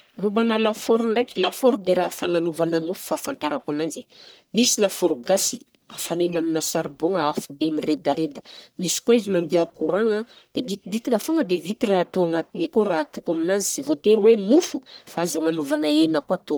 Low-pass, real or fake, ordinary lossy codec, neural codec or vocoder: none; fake; none; codec, 44.1 kHz, 1.7 kbps, Pupu-Codec